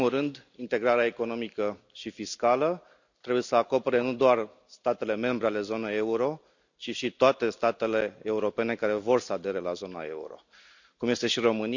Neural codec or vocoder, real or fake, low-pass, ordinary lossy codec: none; real; 7.2 kHz; none